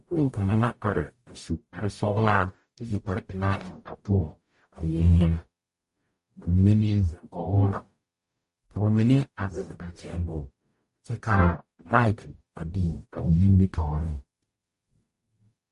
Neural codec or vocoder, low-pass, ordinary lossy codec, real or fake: codec, 44.1 kHz, 0.9 kbps, DAC; 14.4 kHz; MP3, 48 kbps; fake